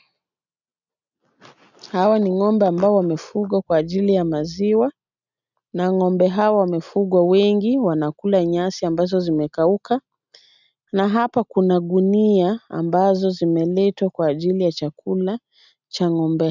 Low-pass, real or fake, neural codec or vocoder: 7.2 kHz; real; none